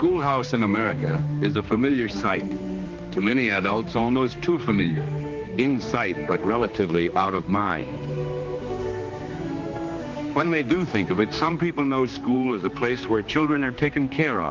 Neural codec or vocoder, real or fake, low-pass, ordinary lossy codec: codec, 16 kHz, 2 kbps, X-Codec, HuBERT features, trained on general audio; fake; 7.2 kHz; Opus, 32 kbps